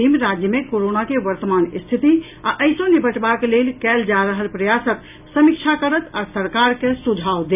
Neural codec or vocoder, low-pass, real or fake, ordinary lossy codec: none; 3.6 kHz; real; none